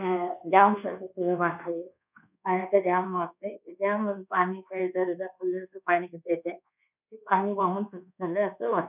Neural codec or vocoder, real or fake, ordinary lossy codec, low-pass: codec, 24 kHz, 1.2 kbps, DualCodec; fake; none; 3.6 kHz